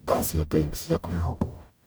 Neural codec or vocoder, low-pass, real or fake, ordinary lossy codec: codec, 44.1 kHz, 0.9 kbps, DAC; none; fake; none